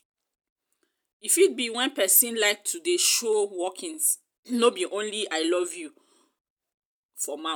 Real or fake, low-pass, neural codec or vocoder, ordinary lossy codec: real; none; none; none